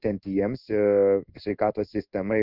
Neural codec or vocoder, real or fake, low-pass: codec, 16 kHz in and 24 kHz out, 1 kbps, XY-Tokenizer; fake; 5.4 kHz